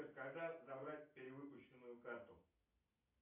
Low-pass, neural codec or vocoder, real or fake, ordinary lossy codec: 3.6 kHz; none; real; AAC, 32 kbps